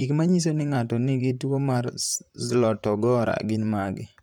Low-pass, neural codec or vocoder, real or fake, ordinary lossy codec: 19.8 kHz; vocoder, 44.1 kHz, 128 mel bands, Pupu-Vocoder; fake; none